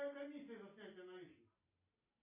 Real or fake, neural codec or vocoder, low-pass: real; none; 3.6 kHz